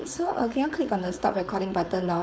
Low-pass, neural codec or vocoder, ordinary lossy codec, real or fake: none; codec, 16 kHz, 4.8 kbps, FACodec; none; fake